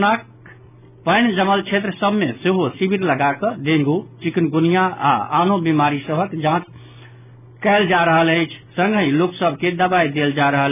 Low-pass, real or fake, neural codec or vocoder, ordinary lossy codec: 3.6 kHz; real; none; none